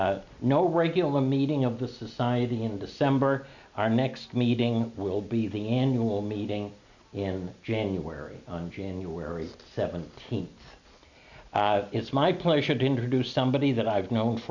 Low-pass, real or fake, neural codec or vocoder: 7.2 kHz; real; none